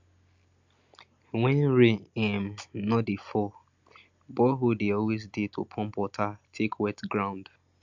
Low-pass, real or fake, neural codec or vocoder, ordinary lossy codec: 7.2 kHz; real; none; MP3, 64 kbps